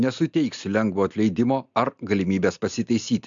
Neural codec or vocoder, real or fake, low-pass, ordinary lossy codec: none; real; 7.2 kHz; MP3, 64 kbps